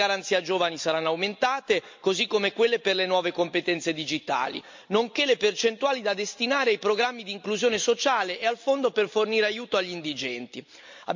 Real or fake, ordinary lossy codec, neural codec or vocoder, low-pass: real; MP3, 48 kbps; none; 7.2 kHz